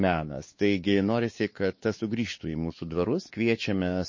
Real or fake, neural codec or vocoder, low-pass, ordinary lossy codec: fake; codec, 16 kHz, 4 kbps, X-Codec, WavLM features, trained on Multilingual LibriSpeech; 7.2 kHz; MP3, 32 kbps